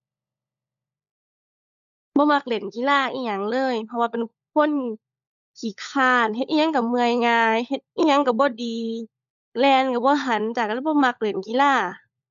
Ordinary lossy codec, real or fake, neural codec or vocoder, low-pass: AAC, 96 kbps; fake; codec, 16 kHz, 16 kbps, FunCodec, trained on LibriTTS, 50 frames a second; 7.2 kHz